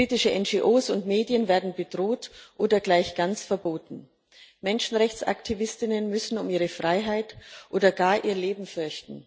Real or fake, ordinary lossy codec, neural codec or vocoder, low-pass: real; none; none; none